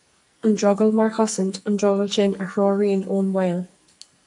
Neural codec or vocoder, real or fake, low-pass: codec, 44.1 kHz, 2.6 kbps, SNAC; fake; 10.8 kHz